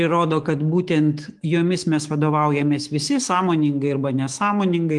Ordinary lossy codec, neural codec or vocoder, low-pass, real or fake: Opus, 24 kbps; autoencoder, 48 kHz, 128 numbers a frame, DAC-VAE, trained on Japanese speech; 10.8 kHz; fake